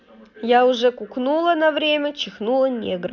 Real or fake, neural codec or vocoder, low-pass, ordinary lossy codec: real; none; 7.2 kHz; none